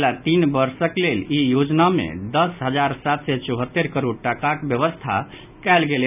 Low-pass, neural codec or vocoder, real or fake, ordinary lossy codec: 3.6 kHz; none; real; none